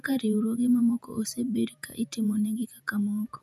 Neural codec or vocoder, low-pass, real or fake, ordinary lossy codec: vocoder, 48 kHz, 128 mel bands, Vocos; 14.4 kHz; fake; none